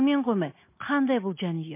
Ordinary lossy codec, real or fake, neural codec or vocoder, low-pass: MP3, 24 kbps; real; none; 3.6 kHz